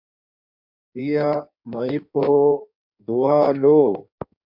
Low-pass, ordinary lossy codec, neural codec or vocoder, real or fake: 5.4 kHz; MP3, 48 kbps; codec, 16 kHz in and 24 kHz out, 1.1 kbps, FireRedTTS-2 codec; fake